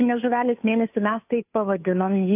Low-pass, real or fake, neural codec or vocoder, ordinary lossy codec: 3.6 kHz; fake; codec, 16 kHz, 2 kbps, FunCodec, trained on Chinese and English, 25 frames a second; AAC, 32 kbps